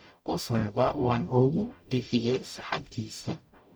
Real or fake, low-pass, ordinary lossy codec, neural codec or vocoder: fake; none; none; codec, 44.1 kHz, 0.9 kbps, DAC